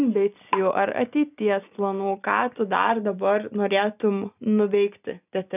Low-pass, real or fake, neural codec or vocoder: 3.6 kHz; real; none